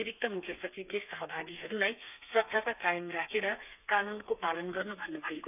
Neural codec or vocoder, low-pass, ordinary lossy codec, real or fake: codec, 32 kHz, 1.9 kbps, SNAC; 3.6 kHz; none; fake